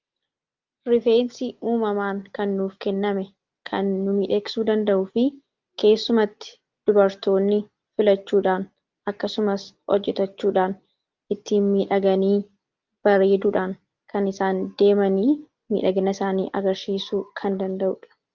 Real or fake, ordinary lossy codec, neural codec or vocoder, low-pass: real; Opus, 24 kbps; none; 7.2 kHz